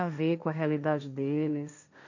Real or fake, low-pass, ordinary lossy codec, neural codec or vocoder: fake; 7.2 kHz; none; codec, 16 kHz, 1.1 kbps, Voila-Tokenizer